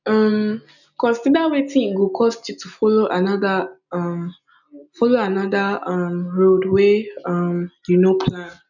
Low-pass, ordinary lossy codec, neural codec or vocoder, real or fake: 7.2 kHz; none; none; real